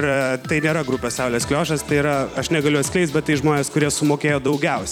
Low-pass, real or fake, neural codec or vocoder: 19.8 kHz; fake; vocoder, 44.1 kHz, 128 mel bands every 256 samples, BigVGAN v2